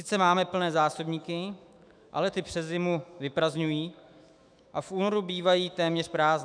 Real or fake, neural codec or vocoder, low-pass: fake; autoencoder, 48 kHz, 128 numbers a frame, DAC-VAE, trained on Japanese speech; 9.9 kHz